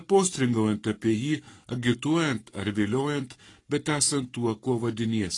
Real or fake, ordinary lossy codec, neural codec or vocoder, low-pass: fake; AAC, 32 kbps; codec, 44.1 kHz, 7.8 kbps, Pupu-Codec; 10.8 kHz